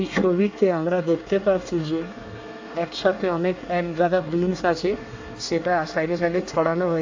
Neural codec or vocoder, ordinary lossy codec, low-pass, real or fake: codec, 24 kHz, 1 kbps, SNAC; AAC, 48 kbps; 7.2 kHz; fake